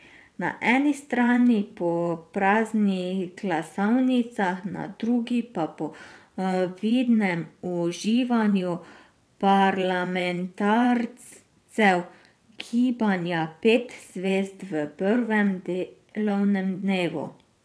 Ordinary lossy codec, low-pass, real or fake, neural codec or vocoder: none; none; fake; vocoder, 22.05 kHz, 80 mel bands, WaveNeXt